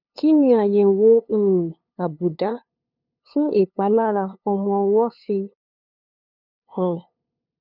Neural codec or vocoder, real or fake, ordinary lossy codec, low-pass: codec, 16 kHz, 2 kbps, FunCodec, trained on LibriTTS, 25 frames a second; fake; none; 5.4 kHz